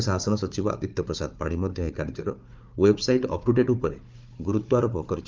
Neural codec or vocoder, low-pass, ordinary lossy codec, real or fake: codec, 16 kHz, 4 kbps, FunCodec, trained on Chinese and English, 50 frames a second; 7.2 kHz; Opus, 24 kbps; fake